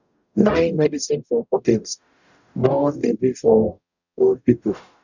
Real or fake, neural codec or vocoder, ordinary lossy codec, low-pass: fake; codec, 44.1 kHz, 0.9 kbps, DAC; none; 7.2 kHz